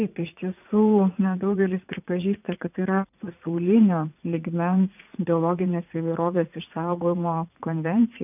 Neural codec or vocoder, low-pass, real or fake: vocoder, 44.1 kHz, 80 mel bands, Vocos; 3.6 kHz; fake